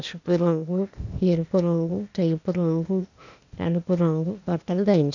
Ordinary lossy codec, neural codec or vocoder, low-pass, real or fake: none; codec, 16 kHz, 0.8 kbps, ZipCodec; 7.2 kHz; fake